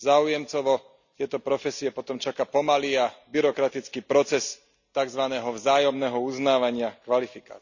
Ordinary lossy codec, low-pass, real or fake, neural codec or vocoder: none; 7.2 kHz; real; none